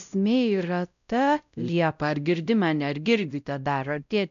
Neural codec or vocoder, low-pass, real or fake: codec, 16 kHz, 0.5 kbps, X-Codec, WavLM features, trained on Multilingual LibriSpeech; 7.2 kHz; fake